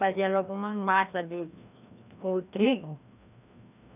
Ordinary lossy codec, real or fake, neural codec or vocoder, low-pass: none; fake; codec, 16 kHz, 1 kbps, FreqCodec, larger model; 3.6 kHz